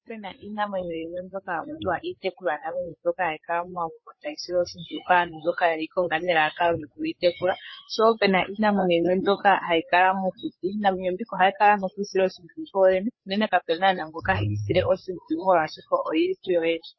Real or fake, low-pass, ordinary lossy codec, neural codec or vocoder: fake; 7.2 kHz; MP3, 24 kbps; codec, 16 kHz, 4 kbps, FreqCodec, larger model